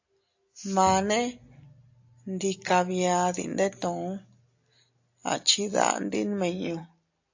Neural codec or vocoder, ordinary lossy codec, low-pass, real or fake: none; AAC, 48 kbps; 7.2 kHz; real